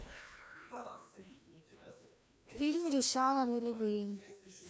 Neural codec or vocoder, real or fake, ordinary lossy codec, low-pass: codec, 16 kHz, 1 kbps, FreqCodec, larger model; fake; none; none